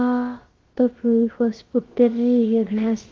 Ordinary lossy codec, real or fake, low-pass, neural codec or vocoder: Opus, 16 kbps; fake; 7.2 kHz; codec, 16 kHz, about 1 kbps, DyCAST, with the encoder's durations